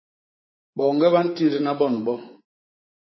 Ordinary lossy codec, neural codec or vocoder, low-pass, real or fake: MP3, 24 kbps; codec, 24 kHz, 3.1 kbps, DualCodec; 7.2 kHz; fake